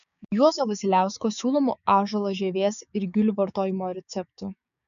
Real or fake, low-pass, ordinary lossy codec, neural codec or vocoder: fake; 7.2 kHz; MP3, 96 kbps; codec, 16 kHz, 6 kbps, DAC